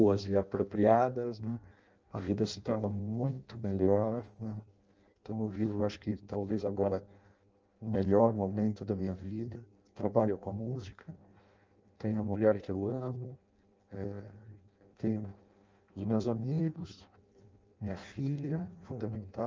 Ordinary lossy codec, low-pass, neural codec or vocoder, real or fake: Opus, 24 kbps; 7.2 kHz; codec, 16 kHz in and 24 kHz out, 0.6 kbps, FireRedTTS-2 codec; fake